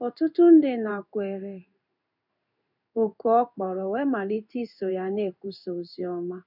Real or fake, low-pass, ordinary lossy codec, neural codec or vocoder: fake; 5.4 kHz; none; codec, 16 kHz in and 24 kHz out, 1 kbps, XY-Tokenizer